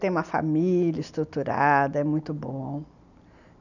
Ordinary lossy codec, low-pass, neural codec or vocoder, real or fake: none; 7.2 kHz; none; real